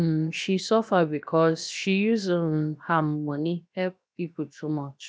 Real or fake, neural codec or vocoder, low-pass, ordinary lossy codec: fake; codec, 16 kHz, 0.7 kbps, FocalCodec; none; none